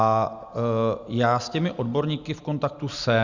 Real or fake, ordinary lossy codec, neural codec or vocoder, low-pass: real; Opus, 64 kbps; none; 7.2 kHz